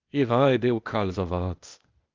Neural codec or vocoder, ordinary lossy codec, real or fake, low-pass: codec, 16 kHz, 0.8 kbps, ZipCodec; Opus, 32 kbps; fake; 7.2 kHz